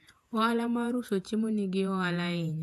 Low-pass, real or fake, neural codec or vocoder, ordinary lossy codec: 14.4 kHz; fake; vocoder, 48 kHz, 128 mel bands, Vocos; AAC, 96 kbps